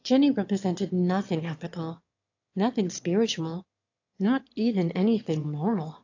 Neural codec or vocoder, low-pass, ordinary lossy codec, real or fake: autoencoder, 22.05 kHz, a latent of 192 numbers a frame, VITS, trained on one speaker; 7.2 kHz; AAC, 48 kbps; fake